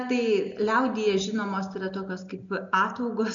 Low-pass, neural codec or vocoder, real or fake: 7.2 kHz; none; real